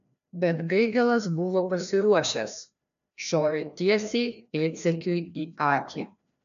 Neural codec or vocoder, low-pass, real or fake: codec, 16 kHz, 1 kbps, FreqCodec, larger model; 7.2 kHz; fake